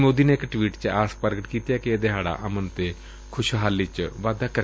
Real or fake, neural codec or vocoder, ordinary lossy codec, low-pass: real; none; none; none